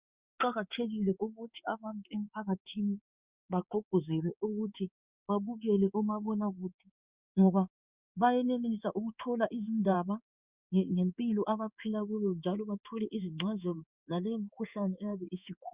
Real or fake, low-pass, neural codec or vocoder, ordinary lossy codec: fake; 3.6 kHz; codec, 16 kHz in and 24 kHz out, 1 kbps, XY-Tokenizer; Opus, 64 kbps